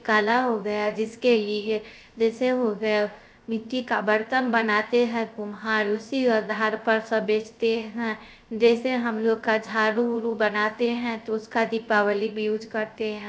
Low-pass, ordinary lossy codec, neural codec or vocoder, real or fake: none; none; codec, 16 kHz, 0.3 kbps, FocalCodec; fake